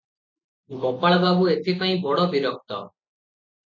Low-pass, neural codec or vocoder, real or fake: 7.2 kHz; none; real